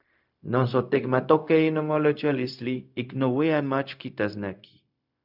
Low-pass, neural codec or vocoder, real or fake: 5.4 kHz; codec, 16 kHz, 0.4 kbps, LongCat-Audio-Codec; fake